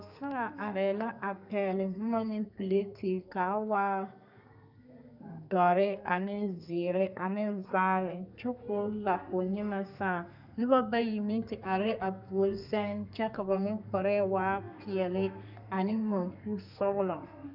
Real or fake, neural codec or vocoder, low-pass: fake; codec, 32 kHz, 1.9 kbps, SNAC; 5.4 kHz